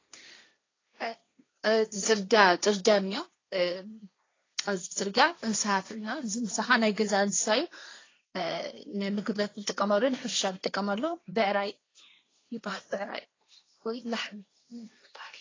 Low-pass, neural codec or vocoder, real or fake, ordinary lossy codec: 7.2 kHz; codec, 16 kHz, 1.1 kbps, Voila-Tokenizer; fake; AAC, 32 kbps